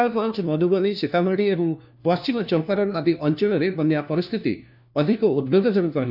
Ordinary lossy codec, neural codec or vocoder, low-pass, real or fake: none; codec, 16 kHz, 1 kbps, FunCodec, trained on LibriTTS, 50 frames a second; 5.4 kHz; fake